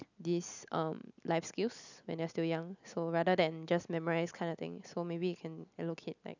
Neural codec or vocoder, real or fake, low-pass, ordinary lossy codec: none; real; 7.2 kHz; none